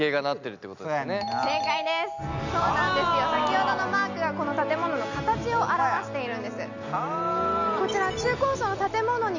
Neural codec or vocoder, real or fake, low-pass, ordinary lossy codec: none; real; 7.2 kHz; none